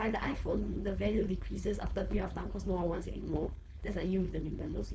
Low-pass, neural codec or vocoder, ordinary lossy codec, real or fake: none; codec, 16 kHz, 4.8 kbps, FACodec; none; fake